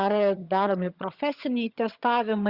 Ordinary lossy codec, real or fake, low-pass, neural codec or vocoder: Opus, 64 kbps; fake; 5.4 kHz; vocoder, 22.05 kHz, 80 mel bands, HiFi-GAN